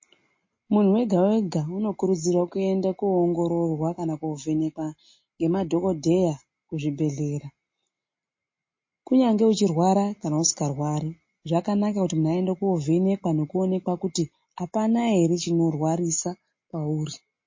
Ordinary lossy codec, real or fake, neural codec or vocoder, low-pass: MP3, 32 kbps; real; none; 7.2 kHz